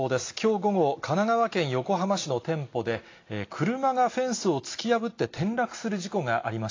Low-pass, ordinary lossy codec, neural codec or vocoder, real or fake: 7.2 kHz; AAC, 32 kbps; none; real